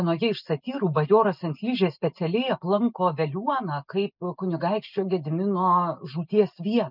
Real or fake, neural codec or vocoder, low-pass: real; none; 5.4 kHz